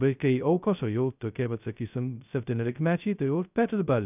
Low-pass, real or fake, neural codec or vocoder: 3.6 kHz; fake; codec, 16 kHz, 0.2 kbps, FocalCodec